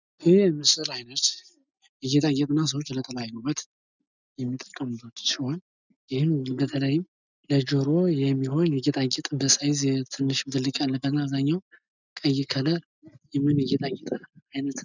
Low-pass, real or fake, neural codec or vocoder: 7.2 kHz; real; none